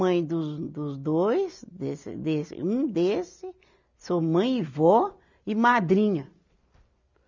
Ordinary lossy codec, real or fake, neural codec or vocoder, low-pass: none; real; none; 7.2 kHz